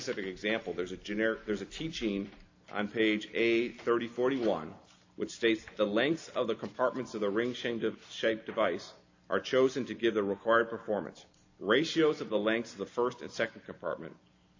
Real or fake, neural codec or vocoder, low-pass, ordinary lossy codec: real; none; 7.2 kHz; AAC, 48 kbps